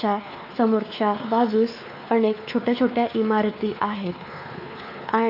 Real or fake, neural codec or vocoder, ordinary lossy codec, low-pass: fake; codec, 16 kHz, 4 kbps, X-Codec, WavLM features, trained on Multilingual LibriSpeech; AAC, 32 kbps; 5.4 kHz